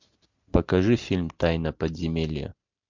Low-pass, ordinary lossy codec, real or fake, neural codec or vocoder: 7.2 kHz; MP3, 64 kbps; real; none